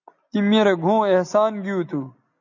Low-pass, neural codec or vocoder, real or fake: 7.2 kHz; none; real